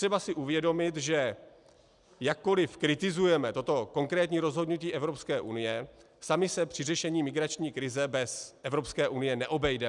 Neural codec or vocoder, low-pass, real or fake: none; 10.8 kHz; real